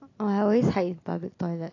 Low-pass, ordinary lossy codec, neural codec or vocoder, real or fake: 7.2 kHz; AAC, 32 kbps; none; real